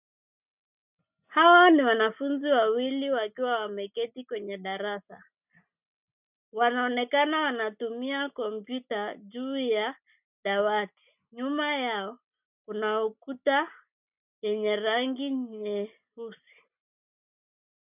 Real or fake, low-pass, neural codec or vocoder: real; 3.6 kHz; none